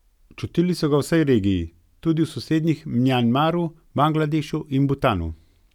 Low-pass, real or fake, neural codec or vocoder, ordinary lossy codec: 19.8 kHz; real; none; none